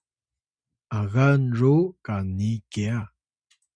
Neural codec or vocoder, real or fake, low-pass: none; real; 9.9 kHz